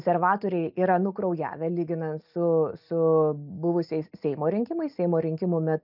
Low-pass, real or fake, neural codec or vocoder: 5.4 kHz; real; none